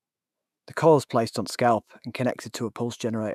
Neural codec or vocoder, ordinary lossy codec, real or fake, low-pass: autoencoder, 48 kHz, 128 numbers a frame, DAC-VAE, trained on Japanese speech; none; fake; 14.4 kHz